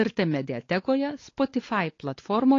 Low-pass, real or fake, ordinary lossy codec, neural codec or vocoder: 7.2 kHz; fake; AAC, 32 kbps; codec, 16 kHz, 4 kbps, X-Codec, WavLM features, trained on Multilingual LibriSpeech